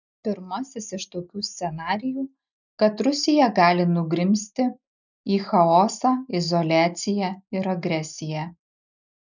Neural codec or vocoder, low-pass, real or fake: none; 7.2 kHz; real